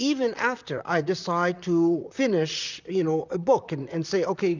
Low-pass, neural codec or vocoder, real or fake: 7.2 kHz; vocoder, 44.1 kHz, 128 mel bands, Pupu-Vocoder; fake